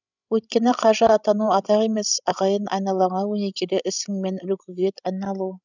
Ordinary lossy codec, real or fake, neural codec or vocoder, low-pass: none; fake; codec, 16 kHz, 16 kbps, FreqCodec, larger model; 7.2 kHz